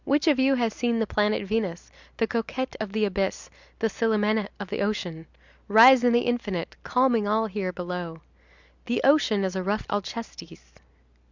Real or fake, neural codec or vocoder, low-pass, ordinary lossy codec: real; none; 7.2 kHz; Opus, 64 kbps